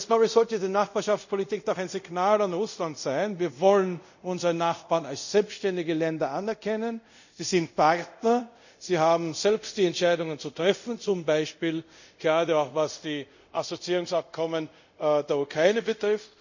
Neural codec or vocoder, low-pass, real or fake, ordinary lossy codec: codec, 24 kHz, 0.5 kbps, DualCodec; 7.2 kHz; fake; none